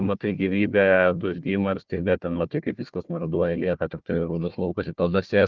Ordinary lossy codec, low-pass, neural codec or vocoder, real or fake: Opus, 32 kbps; 7.2 kHz; codec, 16 kHz, 1 kbps, FunCodec, trained on Chinese and English, 50 frames a second; fake